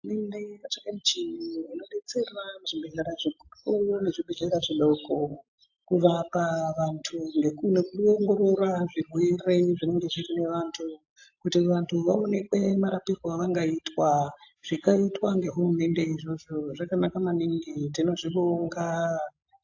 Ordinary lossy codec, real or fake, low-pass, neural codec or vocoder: AAC, 48 kbps; real; 7.2 kHz; none